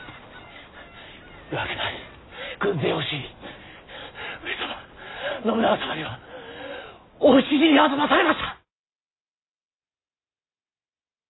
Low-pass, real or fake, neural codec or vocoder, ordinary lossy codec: 7.2 kHz; real; none; AAC, 16 kbps